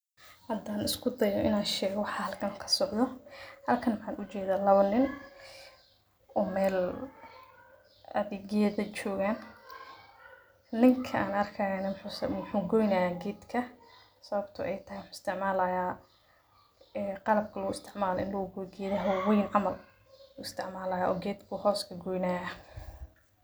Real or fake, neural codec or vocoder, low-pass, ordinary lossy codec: real; none; none; none